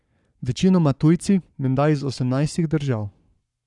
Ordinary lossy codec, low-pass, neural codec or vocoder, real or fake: none; 10.8 kHz; codec, 44.1 kHz, 7.8 kbps, Pupu-Codec; fake